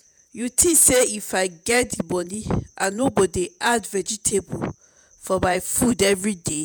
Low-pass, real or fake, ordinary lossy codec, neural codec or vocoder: none; fake; none; vocoder, 48 kHz, 128 mel bands, Vocos